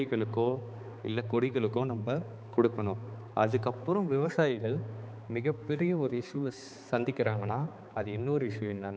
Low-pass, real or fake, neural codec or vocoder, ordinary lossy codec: none; fake; codec, 16 kHz, 4 kbps, X-Codec, HuBERT features, trained on balanced general audio; none